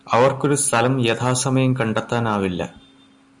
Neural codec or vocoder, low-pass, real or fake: none; 10.8 kHz; real